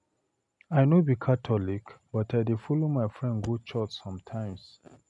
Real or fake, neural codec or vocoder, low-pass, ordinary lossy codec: real; none; 10.8 kHz; none